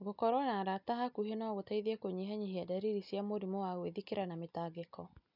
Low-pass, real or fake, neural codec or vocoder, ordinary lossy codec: 5.4 kHz; real; none; none